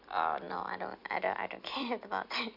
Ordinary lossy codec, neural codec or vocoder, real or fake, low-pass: none; none; real; 5.4 kHz